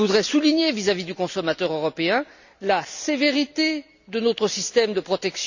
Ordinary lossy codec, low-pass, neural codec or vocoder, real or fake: none; 7.2 kHz; none; real